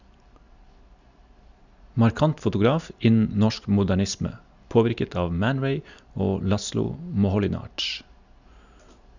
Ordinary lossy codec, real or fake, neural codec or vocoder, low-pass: none; real; none; 7.2 kHz